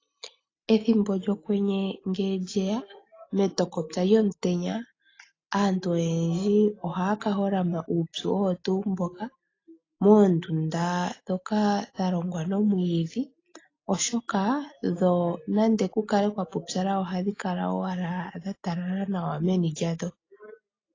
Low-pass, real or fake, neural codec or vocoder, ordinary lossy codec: 7.2 kHz; real; none; AAC, 32 kbps